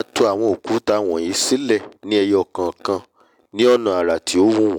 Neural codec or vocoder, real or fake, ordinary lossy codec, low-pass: none; real; none; 19.8 kHz